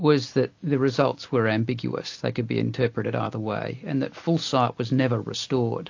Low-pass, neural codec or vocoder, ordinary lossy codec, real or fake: 7.2 kHz; none; AAC, 48 kbps; real